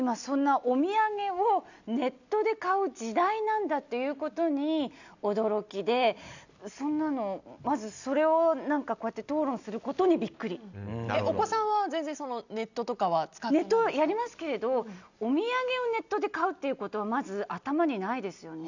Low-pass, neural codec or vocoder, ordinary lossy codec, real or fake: 7.2 kHz; none; none; real